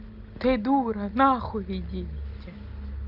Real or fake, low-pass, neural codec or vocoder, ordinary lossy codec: real; 5.4 kHz; none; Opus, 24 kbps